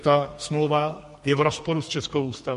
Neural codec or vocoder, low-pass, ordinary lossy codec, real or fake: codec, 32 kHz, 1.9 kbps, SNAC; 14.4 kHz; MP3, 48 kbps; fake